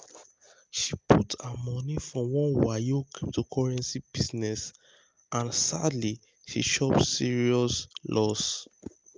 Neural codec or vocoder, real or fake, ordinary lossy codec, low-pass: none; real; Opus, 24 kbps; 7.2 kHz